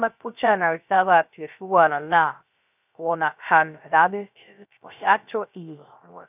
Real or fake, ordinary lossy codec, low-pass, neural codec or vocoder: fake; none; 3.6 kHz; codec, 16 kHz, 0.3 kbps, FocalCodec